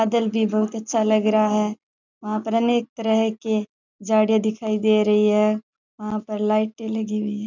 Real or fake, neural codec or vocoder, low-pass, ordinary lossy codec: real; none; 7.2 kHz; none